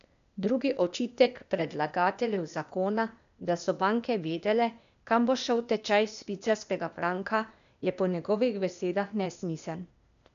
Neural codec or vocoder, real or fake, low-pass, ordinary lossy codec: codec, 16 kHz, 0.8 kbps, ZipCodec; fake; 7.2 kHz; none